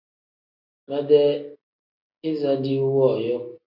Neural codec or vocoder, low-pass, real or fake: none; 5.4 kHz; real